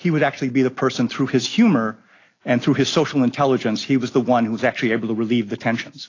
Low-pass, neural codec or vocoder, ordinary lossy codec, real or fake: 7.2 kHz; none; AAC, 32 kbps; real